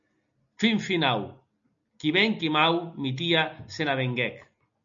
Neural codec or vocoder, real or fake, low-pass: none; real; 7.2 kHz